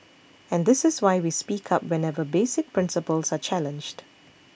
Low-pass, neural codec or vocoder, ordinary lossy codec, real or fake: none; none; none; real